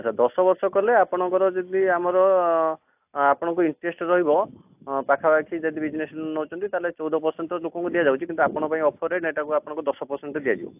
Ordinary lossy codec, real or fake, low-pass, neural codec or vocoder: AAC, 32 kbps; real; 3.6 kHz; none